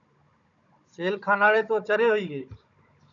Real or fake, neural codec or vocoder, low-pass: fake; codec, 16 kHz, 16 kbps, FunCodec, trained on Chinese and English, 50 frames a second; 7.2 kHz